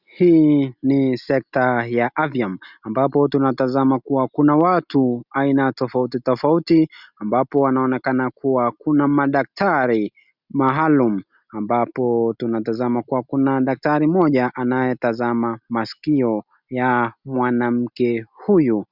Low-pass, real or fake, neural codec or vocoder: 5.4 kHz; real; none